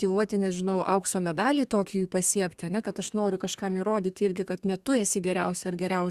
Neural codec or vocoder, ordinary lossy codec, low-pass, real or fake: codec, 32 kHz, 1.9 kbps, SNAC; Opus, 64 kbps; 14.4 kHz; fake